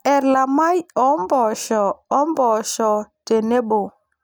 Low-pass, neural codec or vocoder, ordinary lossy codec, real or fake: none; none; none; real